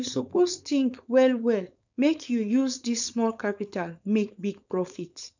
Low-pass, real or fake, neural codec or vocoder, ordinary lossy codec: 7.2 kHz; fake; codec, 16 kHz, 4.8 kbps, FACodec; none